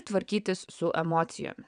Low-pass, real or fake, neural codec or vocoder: 9.9 kHz; fake; vocoder, 22.05 kHz, 80 mel bands, WaveNeXt